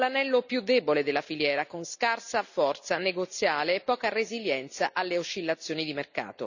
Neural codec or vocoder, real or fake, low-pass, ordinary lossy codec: none; real; 7.2 kHz; none